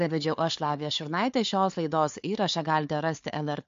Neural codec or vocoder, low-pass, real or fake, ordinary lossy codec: codec, 16 kHz, 4 kbps, FunCodec, trained on Chinese and English, 50 frames a second; 7.2 kHz; fake; MP3, 48 kbps